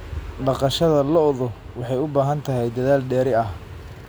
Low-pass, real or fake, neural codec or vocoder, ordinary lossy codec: none; real; none; none